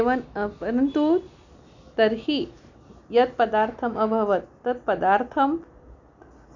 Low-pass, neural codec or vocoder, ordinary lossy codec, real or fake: 7.2 kHz; none; none; real